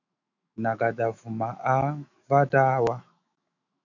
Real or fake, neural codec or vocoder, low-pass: fake; autoencoder, 48 kHz, 128 numbers a frame, DAC-VAE, trained on Japanese speech; 7.2 kHz